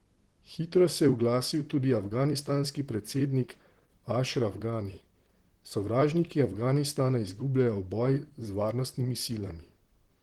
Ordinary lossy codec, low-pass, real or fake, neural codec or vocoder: Opus, 16 kbps; 19.8 kHz; fake; vocoder, 44.1 kHz, 128 mel bands, Pupu-Vocoder